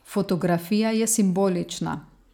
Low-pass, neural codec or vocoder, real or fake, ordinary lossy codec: 19.8 kHz; none; real; none